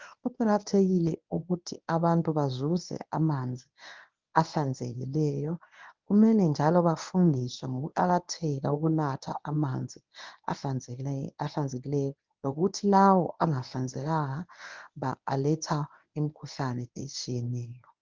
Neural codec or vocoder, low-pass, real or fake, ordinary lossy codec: codec, 24 kHz, 0.9 kbps, WavTokenizer, medium speech release version 1; 7.2 kHz; fake; Opus, 24 kbps